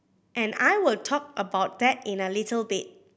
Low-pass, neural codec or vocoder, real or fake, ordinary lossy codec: none; none; real; none